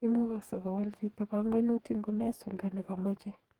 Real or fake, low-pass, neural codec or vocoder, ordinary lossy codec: fake; 19.8 kHz; codec, 44.1 kHz, 2.6 kbps, DAC; Opus, 24 kbps